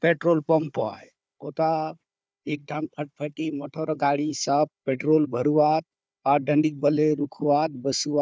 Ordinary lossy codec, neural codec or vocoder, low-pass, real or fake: none; codec, 16 kHz, 4 kbps, FunCodec, trained on Chinese and English, 50 frames a second; none; fake